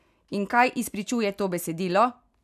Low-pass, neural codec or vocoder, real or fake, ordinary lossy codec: 14.4 kHz; none; real; none